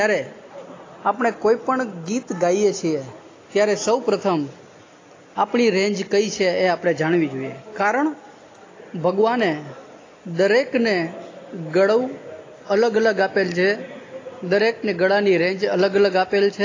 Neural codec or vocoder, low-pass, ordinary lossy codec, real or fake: none; 7.2 kHz; AAC, 32 kbps; real